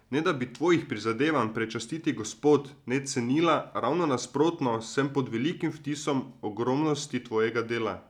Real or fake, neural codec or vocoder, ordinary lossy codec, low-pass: real; none; none; 19.8 kHz